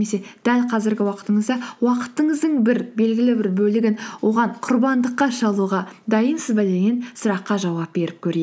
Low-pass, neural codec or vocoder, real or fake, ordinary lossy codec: none; none; real; none